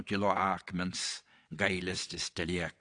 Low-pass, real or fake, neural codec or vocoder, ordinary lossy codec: 9.9 kHz; fake; vocoder, 22.05 kHz, 80 mel bands, WaveNeXt; AAC, 48 kbps